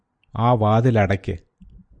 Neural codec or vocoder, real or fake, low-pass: vocoder, 44.1 kHz, 128 mel bands every 512 samples, BigVGAN v2; fake; 9.9 kHz